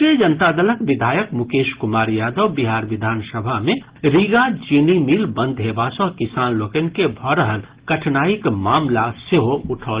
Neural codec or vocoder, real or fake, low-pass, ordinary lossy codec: none; real; 3.6 kHz; Opus, 16 kbps